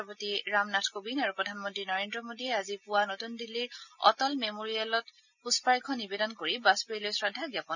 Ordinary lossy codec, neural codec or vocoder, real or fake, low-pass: none; none; real; 7.2 kHz